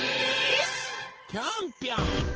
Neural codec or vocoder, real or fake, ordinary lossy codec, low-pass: vocoder, 22.05 kHz, 80 mel bands, WaveNeXt; fake; Opus, 16 kbps; 7.2 kHz